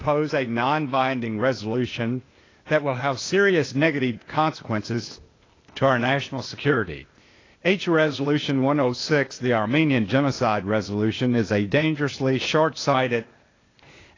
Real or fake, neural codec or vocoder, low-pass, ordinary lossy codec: fake; codec, 16 kHz, 0.8 kbps, ZipCodec; 7.2 kHz; AAC, 32 kbps